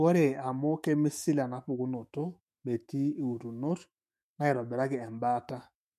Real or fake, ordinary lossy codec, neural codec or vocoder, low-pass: fake; MP3, 64 kbps; autoencoder, 48 kHz, 128 numbers a frame, DAC-VAE, trained on Japanese speech; 14.4 kHz